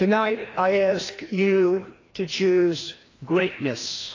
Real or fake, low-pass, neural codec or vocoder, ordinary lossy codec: fake; 7.2 kHz; codec, 16 kHz, 1 kbps, FreqCodec, larger model; AAC, 32 kbps